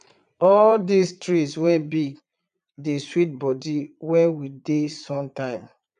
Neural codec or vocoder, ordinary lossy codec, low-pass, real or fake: vocoder, 22.05 kHz, 80 mel bands, Vocos; none; 9.9 kHz; fake